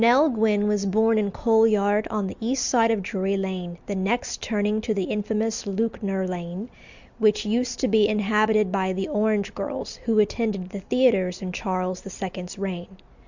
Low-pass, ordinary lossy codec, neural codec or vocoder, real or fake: 7.2 kHz; Opus, 64 kbps; none; real